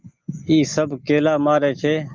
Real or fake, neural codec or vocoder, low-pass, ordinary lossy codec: real; none; 7.2 kHz; Opus, 24 kbps